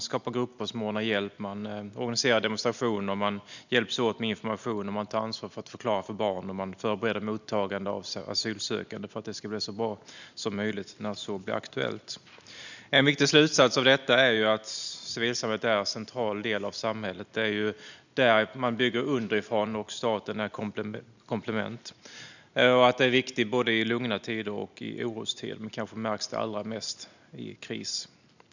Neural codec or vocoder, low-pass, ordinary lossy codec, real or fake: none; 7.2 kHz; none; real